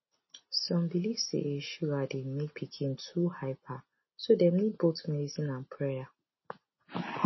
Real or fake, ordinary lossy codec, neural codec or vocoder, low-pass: real; MP3, 24 kbps; none; 7.2 kHz